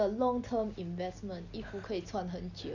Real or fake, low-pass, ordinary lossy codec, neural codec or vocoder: real; 7.2 kHz; none; none